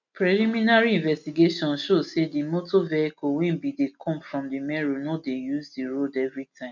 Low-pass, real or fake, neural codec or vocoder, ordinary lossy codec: 7.2 kHz; real; none; none